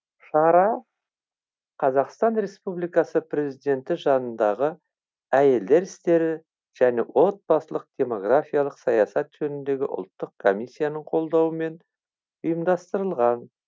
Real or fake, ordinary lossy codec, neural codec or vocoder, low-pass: real; none; none; none